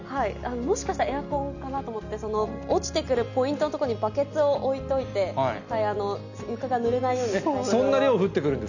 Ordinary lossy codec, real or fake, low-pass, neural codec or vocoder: none; real; 7.2 kHz; none